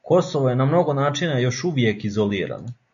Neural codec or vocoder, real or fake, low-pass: none; real; 7.2 kHz